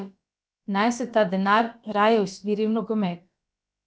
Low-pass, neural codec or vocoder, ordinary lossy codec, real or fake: none; codec, 16 kHz, about 1 kbps, DyCAST, with the encoder's durations; none; fake